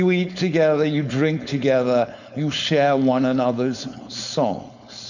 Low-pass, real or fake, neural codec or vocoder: 7.2 kHz; fake; codec, 16 kHz, 4.8 kbps, FACodec